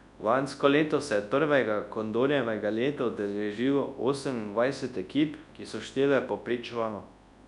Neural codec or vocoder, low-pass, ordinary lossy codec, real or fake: codec, 24 kHz, 0.9 kbps, WavTokenizer, large speech release; 10.8 kHz; none; fake